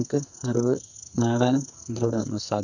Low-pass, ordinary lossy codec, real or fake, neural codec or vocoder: 7.2 kHz; none; fake; codec, 44.1 kHz, 2.6 kbps, SNAC